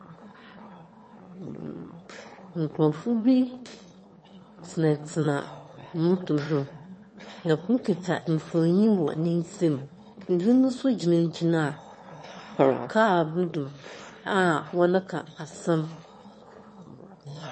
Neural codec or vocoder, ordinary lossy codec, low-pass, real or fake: autoencoder, 22.05 kHz, a latent of 192 numbers a frame, VITS, trained on one speaker; MP3, 32 kbps; 9.9 kHz; fake